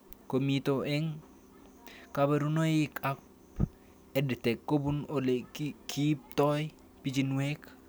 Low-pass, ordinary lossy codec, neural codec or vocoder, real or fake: none; none; none; real